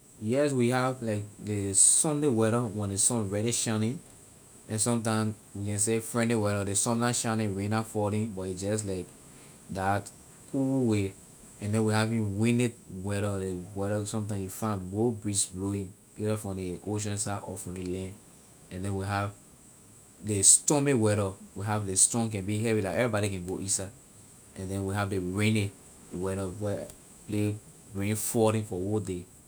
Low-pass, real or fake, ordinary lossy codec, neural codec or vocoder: none; fake; none; autoencoder, 48 kHz, 128 numbers a frame, DAC-VAE, trained on Japanese speech